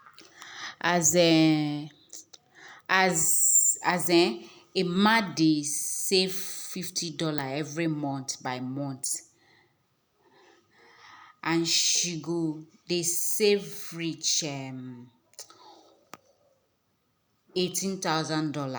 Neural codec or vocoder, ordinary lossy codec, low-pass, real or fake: none; none; none; real